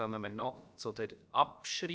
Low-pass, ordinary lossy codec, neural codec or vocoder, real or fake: none; none; codec, 16 kHz, about 1 kbps, DyCAST, with the encoder's durations; fake